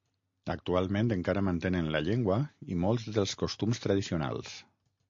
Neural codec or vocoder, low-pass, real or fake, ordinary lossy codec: none; 7.2 kHz; real; MP3, 48 kbps